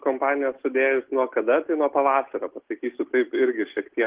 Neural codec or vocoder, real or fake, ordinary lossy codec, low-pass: none; real; Opus, 16 kbps; 3.6 kHz